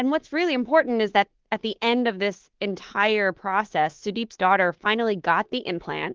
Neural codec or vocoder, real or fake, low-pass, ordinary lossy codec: codec, 24 kHz, 3.1 kbps, DualCodec; fake; 7.2 kHz; Opus, 16 kbps